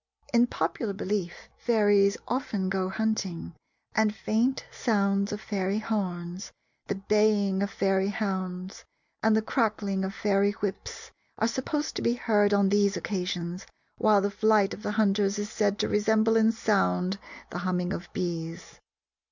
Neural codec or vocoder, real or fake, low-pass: none; real; 7.2 kHz